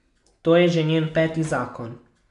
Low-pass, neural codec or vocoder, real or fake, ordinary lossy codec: 10.8 kHz; none; real; none